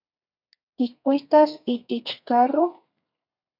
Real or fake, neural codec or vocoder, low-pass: fake; codec, 32 kHz, 1.9 kbps, SNAC; 5.4 kHz